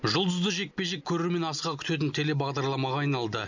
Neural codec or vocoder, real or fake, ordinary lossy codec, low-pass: none; real; none; 7.2 kHz